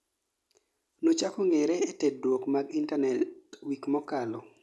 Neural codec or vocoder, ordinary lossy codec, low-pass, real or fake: none; none; none; real